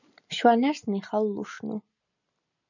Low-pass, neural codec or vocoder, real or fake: 7.2 kHz; none; real